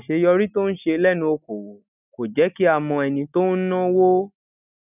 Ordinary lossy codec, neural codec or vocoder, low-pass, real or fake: none; none; 3.6 kHz; real